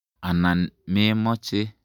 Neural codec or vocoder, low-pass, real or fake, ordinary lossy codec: none; 19.8 kHz; real; none